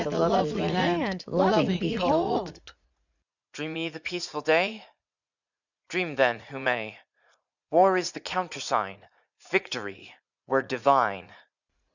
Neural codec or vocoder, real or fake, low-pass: vocoder, 44.1 kHz, 80 mel bands, Vocos; fake; 7.2 kHz